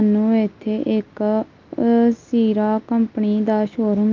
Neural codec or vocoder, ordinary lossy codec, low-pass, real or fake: none; Opus, 24 kbps; 7.2 kHz; real